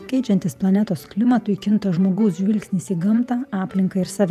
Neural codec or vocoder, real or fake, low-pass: vocoder, 48 kHz, 128 mel bands, Vocos; fake; 14.4 kHz